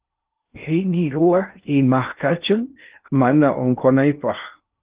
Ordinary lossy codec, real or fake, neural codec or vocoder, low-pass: Opus, 32 kbps; fake; codec, 16 kHz in and 24 kHz out, 0.6 kbps, FocalCodec, streaming, 2048 codes; 3.6 kHz